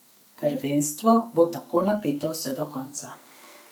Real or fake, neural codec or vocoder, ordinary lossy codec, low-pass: fake; codec, 44.1 kHz, 2.6 kbps, SNAC; none; none